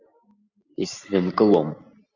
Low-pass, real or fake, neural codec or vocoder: 7.2 kHz; real; none